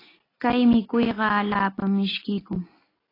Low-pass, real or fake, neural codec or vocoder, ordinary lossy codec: 5.4 kHz; real; none; AAC, 24 kbps